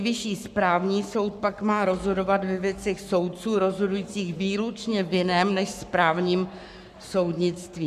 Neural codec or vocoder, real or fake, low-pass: codec, 44.1 kHz, 7.8 kbps, Pupu-Codec; fake; 14.4 kHz